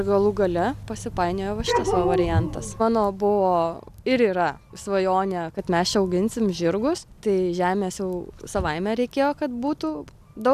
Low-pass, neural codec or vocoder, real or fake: 14.4 kHz; none; real